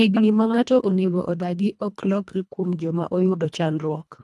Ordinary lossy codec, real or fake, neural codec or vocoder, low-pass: none; fake; codec, 24 kHz, 1.5 kbps, HILCodec; none